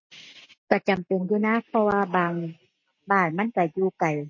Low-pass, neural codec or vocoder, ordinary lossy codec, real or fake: 7.2 kHz; none; MP3, 32 kbps; real